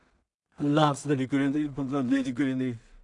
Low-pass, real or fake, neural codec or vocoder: 10.8 kHz; fake; codec, 16 kHz in and 24 kHz out, 0.4 kbps, LongCat-Audio-Codec, two codebook decoder